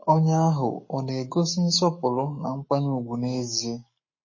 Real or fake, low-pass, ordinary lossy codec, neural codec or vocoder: fake; 7.2 kHz; MP3, 32 kbps; codec, 44.1 kHz, 7.8 kbps, Pupu-Codec